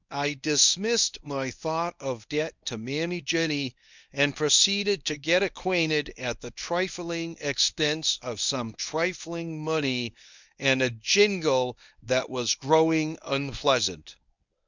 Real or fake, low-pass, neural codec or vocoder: fake; 7.2 kHz; codec, 24 kHz, 0.9 kbps, WavTokenizer, medium speech release version 1